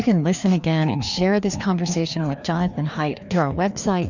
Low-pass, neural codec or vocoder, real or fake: 7.2 kHz; codec, 16 kHz, 2 kbps, FreqCodec, larger model; fake